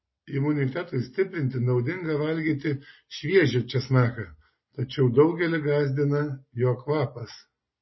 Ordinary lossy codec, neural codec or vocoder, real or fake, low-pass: MP3, 24 kbps; none; real; 7.2 kHz